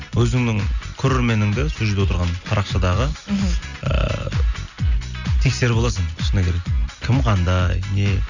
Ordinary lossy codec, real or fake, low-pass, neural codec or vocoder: none; real; 7.2 kHz; none